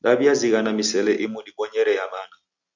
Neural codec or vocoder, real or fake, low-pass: none; real; 7.2 kHz